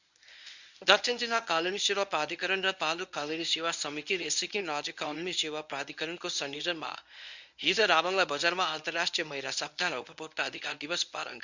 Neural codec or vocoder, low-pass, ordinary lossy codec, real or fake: codec, 24 kHz, 0.9 kbps, WavTokenizer, medium speech release version 1; 7.2 kHz; none; fake